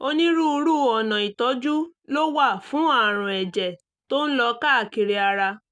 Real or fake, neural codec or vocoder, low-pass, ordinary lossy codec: real; none; 9.9 kHz; none